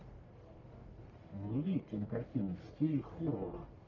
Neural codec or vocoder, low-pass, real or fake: codec, 44.1 kHz, 1.7 kbps, Pupu-Codec; 7.2 kHz; fake